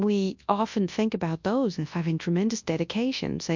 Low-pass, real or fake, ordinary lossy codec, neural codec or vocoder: 7.2 kHz; fake; MP3, 64 kbps; codec, 24 kHz, 0.9 kbps, WavTokenizer, large speech release